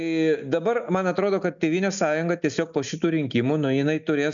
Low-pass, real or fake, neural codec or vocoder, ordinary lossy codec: 7.2 kHz; real; none; MP3, 96 kbps